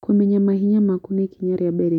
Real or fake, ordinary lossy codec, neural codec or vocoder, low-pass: real; none; none; 19.8 kHz